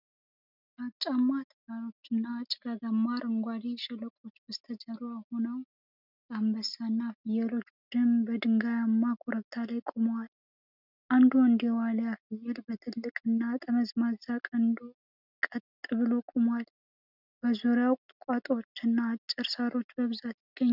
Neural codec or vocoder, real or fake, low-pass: none; real; 5.4 kHz